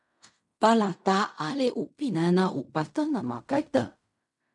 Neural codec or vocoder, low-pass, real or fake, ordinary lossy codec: codec, 16 kHz in and 24 kHz out, 0.4 kbps, LongCat-Audio-Codec, fine tuned four codebook decoder; 10.8 kHz; fake; MP3, 96 kbps